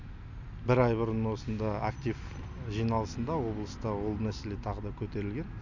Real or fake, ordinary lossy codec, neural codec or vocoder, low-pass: real; none; none; 7.2 kHz